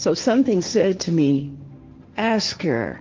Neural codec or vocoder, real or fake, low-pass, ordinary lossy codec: codec, 16 kHz, 1.1 kbps, Voila-Tokenizer; fake; 7.2 kHz; Opus, 24 kbps